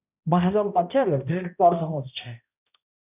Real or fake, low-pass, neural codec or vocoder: fake; 3.6 kHz; codec, 16 kHz, 0.5 kbps, X-Codec, HuBERT features, trained on balanced general audio